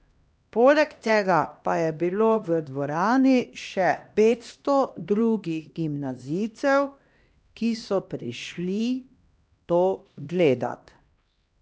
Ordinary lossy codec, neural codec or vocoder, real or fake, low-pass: none; codec, 16 kHz, 1 kbps, X-Codec, HuBERT features, trained on LibriSpeech; fake; none